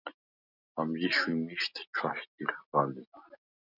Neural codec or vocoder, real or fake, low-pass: none; real; 5.4 kHz